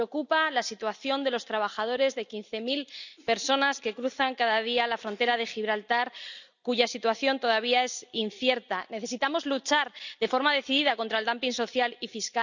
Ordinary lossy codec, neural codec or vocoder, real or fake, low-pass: none; none; real; 7.2 kHz